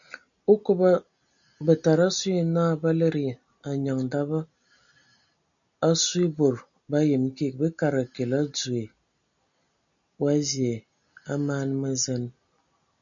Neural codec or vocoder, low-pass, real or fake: none; 7.2 kHz; real